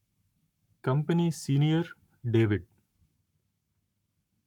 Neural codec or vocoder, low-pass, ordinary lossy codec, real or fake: codec, 44.1 kHz, 7.8 kbps, Pupu-Codec; 19.8 kHz; none; fake